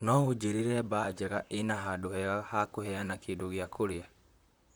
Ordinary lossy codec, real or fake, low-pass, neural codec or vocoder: none; fake; none; vocoder, 44.1 kHz, 128 mel bands, Pupu-Vocoder